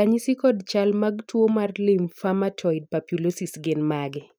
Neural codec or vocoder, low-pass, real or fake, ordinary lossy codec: none; none; real; none